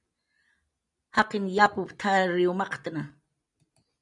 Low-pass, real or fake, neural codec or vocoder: 10.8 kHz; real; none